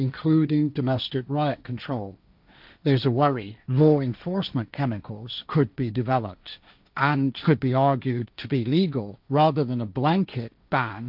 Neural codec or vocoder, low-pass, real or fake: codec, 16 kHz, 1.1 kbps, Voila-Tokenizer; 5.4 kHz; fake